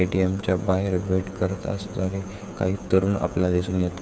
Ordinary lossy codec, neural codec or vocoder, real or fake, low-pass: none; codec, 16 kHz, 8 kbps, FreqCodec, smaller model; fake; none